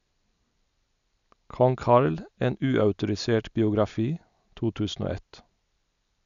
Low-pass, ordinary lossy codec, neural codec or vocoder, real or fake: 7.2 kHz; none; none; real